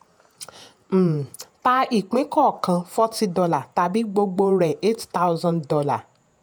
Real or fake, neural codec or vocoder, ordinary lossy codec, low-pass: fake; vocoder, 48 kHz, 128 mel bands, Vocos; none; none